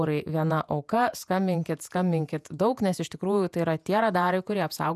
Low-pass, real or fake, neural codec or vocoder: 14.4 kHz; fake; vocoder, 48 kHz, 128 mel bands, Vocos